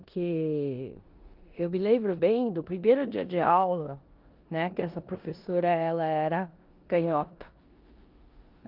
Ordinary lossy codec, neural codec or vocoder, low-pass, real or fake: Opus, 32 kbps; codec, 16 kHz in and 24 kHz out, 0.9 kbps, LongCat-Audio-Codec, four codebook decoder; 5.4 kHz; fake